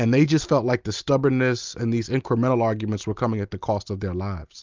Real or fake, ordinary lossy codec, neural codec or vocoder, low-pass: real; Opus, 24 kbps; none; 7.2 kHz